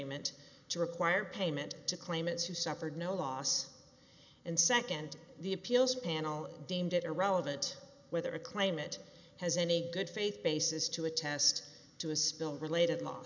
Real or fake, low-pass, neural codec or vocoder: real; 7.2 kHz; none